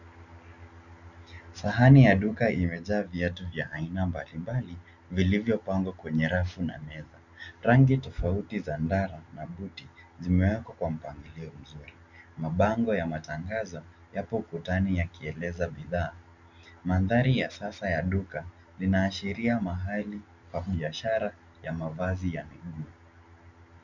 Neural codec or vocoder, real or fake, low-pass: none; real; 7.2 kHz